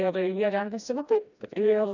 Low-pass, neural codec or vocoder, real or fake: 7.2 kHz; codec, 16 kHz, 1 kbps, FreqCodec, smaller model; fake